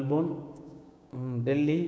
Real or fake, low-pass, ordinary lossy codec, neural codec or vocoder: fake; none; none; codec, 16 kHz, 6 kbps, DAC